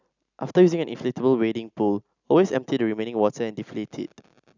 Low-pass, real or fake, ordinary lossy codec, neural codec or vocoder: 7.2 kHz; real; none; none